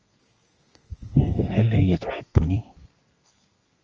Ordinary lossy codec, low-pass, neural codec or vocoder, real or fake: Opus, 24 kbps; 7.2 kHz; codec, 32 kHz, 1.9 kbps, SNAC; fake